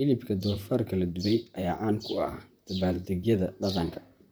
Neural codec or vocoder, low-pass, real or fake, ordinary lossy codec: vocoder, 44.1 kHz, 128 mel bands, Pupu-Vocoder; none; fake; none